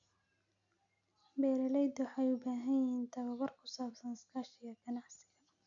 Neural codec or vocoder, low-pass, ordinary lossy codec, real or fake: none; 7.2 kHz; none; real